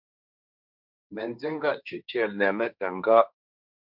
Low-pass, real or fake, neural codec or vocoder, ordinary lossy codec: 5.4 kHz; fake; codec, 16 kHz, 1.1 kbps, Voila-Tokenizer; MP3, 48 kbps